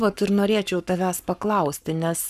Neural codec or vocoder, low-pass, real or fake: codec, 44.1 kHz, 7.8 kbps, Pupu-Codec; 14.4 kHz; fake